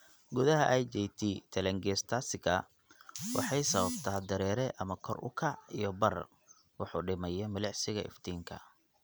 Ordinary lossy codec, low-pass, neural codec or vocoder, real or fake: none; none; none; real